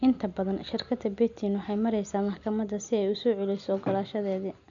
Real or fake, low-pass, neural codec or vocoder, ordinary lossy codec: real; 7.2 kHz; none; none